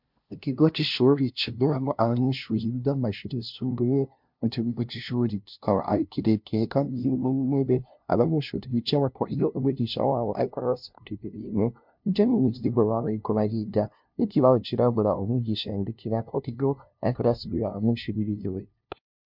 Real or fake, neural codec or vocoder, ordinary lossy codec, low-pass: fake; codec, 16 kHz, 0.5 kbps, FunCodec, trained on LibriTTS, 25 frames a second; MP3, 48 kbps; 5.4 kHz